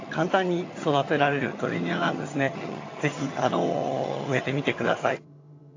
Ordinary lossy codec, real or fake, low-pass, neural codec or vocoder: AAC, 32 kbps; fake; 7.2 kHz; vocoder, 22.05 kHz, 80 mel bands, HiFi-GAN